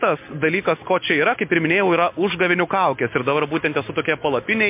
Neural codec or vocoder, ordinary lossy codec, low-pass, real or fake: none; MP3, 32 kbps; 3.6 kHz; real